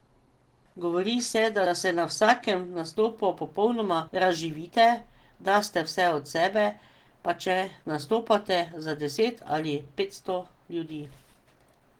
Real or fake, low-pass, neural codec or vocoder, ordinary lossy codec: real; 19.8 kHz; none; Opus, 16 kbps